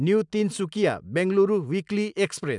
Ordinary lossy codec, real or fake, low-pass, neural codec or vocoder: none; real; 10.8 kHz; none